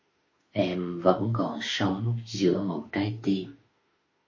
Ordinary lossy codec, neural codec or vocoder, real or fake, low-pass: MP3, 32 kbps; autoencoder, 48 kHz, 32 numbers a frame, DAC-VAE, trained on Japanese speech; fake; 7.2 kHz